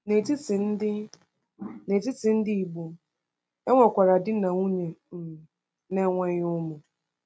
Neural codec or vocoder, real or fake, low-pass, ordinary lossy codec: none; real; none; none